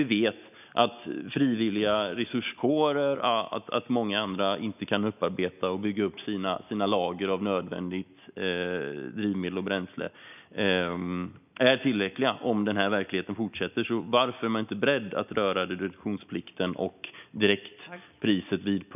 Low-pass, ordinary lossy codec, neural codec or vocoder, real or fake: 3.6 kHz; none; none; real